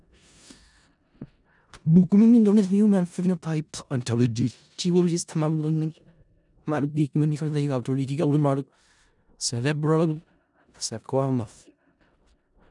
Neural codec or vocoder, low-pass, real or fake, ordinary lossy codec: codec, 16 kHz in and 24 kHz out, 0.4 kbps, LongCat-Audio-Codec, four codebook decoder; 10.8 kHz; fake; none